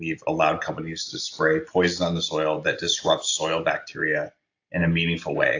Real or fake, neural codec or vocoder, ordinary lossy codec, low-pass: real; none; AAC, 48 kbps; 7.2 kHz